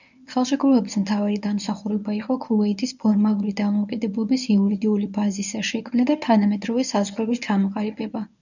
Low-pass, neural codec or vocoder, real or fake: 7.2 kHz; codec, 24 kHz, 0.9 kbps, WavTokenizer, medium speech release version 1; fake